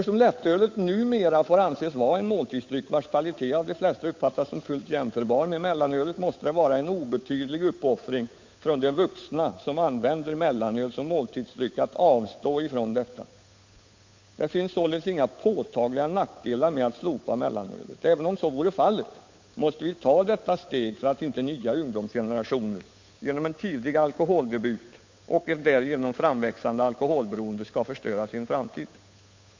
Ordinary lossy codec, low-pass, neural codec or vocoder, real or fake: MP3, 48 kbps; 7.2 kHz; codec, 16 kHz, 8 kbps, FunCodec, trained on Chinese and English, 25 frames a second; fake